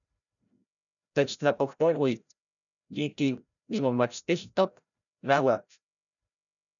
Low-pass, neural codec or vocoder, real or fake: 7.2 kHz; codec, 16 kHz, 0.5 kbps, FreqCodec, larger model; fake